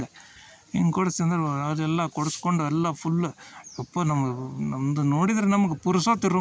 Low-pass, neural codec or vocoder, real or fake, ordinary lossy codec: none; none; real; none